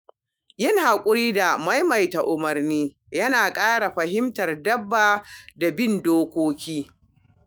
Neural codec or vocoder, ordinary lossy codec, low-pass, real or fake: autoencoder, 48 kHz, 128 numbers a frame, DAC-VAE, trained on Japanese speech; none; none; fake